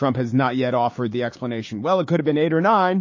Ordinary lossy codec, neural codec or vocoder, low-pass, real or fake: MP3, 32 kbps; codec, 16 kHz, 6 kbps, DAC; 7.2 kHz; fake